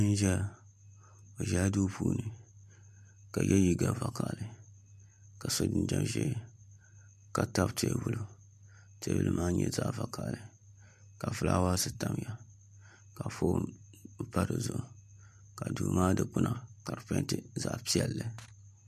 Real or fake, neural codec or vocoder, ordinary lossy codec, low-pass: real; none; MP3, 64 kbps; 14.4 kHz